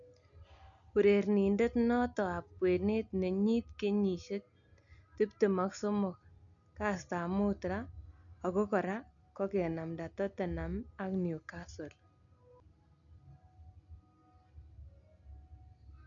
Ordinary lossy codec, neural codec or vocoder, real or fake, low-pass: none; none; real; 7.2 kHz